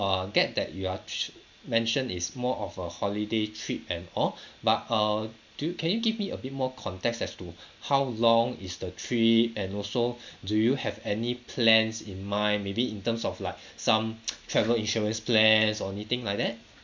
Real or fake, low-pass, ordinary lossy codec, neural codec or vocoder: real; 7.2 kHz; MP3, 64 kbps; none